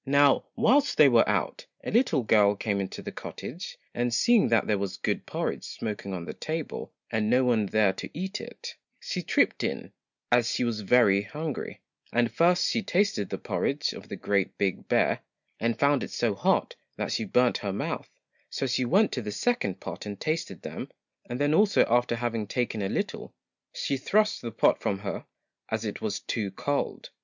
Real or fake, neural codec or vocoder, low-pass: real; none; 7.2 kHz